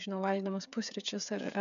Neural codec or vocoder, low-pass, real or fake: codec, 16 kHz, 8 kbps, FreqCodec, smaller model; 7.2 kHz; fake